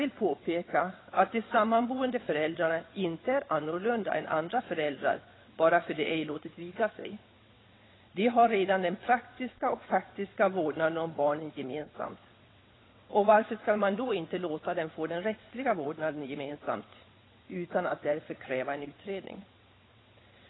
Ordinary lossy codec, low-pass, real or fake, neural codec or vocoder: AAC, 16 kbps; 7.2 kHz; fake; vocoder, 22.05 kHz, 80 mel bands, WaveNeXt